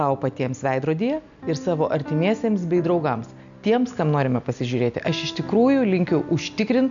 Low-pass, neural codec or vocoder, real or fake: 7.2 kHz; none; real